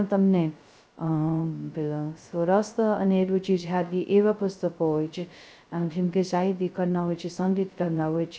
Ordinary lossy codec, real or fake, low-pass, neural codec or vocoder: none; fake; none; codec, 16 kHz, 0.2 kbps, FocalCodec